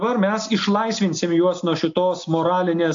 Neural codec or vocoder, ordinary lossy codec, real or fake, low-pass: none; AAC, 64 kbps; real; 7.2 kHz